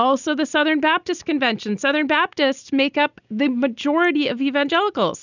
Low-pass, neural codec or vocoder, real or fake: 7.2 kHz; none; real